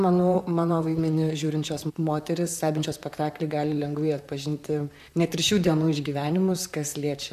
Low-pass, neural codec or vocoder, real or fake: 14.4 kHz; vocoder, 44.1 kHz, 128 mel bands, Pupu-Vocoder; fake